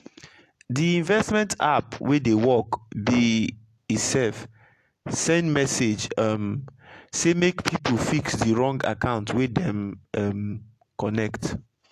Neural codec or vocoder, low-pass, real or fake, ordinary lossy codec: none; 14.4 kHz; real; AAC, 64 kbps